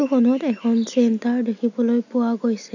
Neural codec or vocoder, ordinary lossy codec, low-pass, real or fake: none; none; 7.2 kHz; real